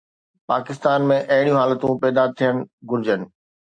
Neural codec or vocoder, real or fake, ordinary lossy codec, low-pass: none; real; AAC, 64 kbps; 9.9 kHz